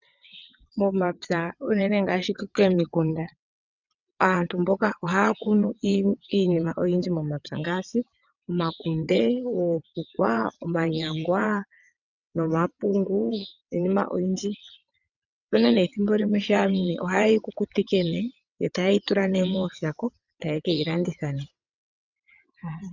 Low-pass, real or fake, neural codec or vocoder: 7.2 kHz; fake; vocoder, 22.05 kHz, 80 mel bands, WaveNeXt